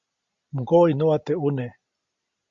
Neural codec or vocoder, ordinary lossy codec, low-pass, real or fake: none; Opus, 64 kbps; 7.2 kHz; real